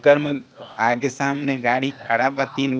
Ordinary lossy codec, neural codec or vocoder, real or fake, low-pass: none; codec, 16 kHz, 0.8 kbps, ZipCodec; fake; none